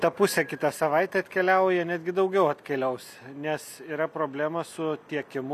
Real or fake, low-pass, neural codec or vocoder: real; 14.4 kHz; none